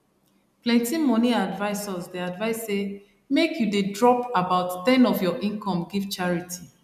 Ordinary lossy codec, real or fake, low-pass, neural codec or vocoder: none; real; 14.4 kHz; none